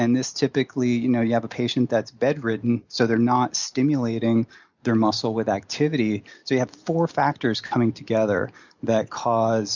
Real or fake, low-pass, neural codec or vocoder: real; 7.2 kHz; none